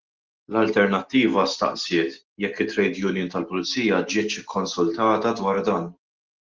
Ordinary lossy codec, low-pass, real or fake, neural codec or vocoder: Opus, 16 kbps; 7.2 kHz; real; none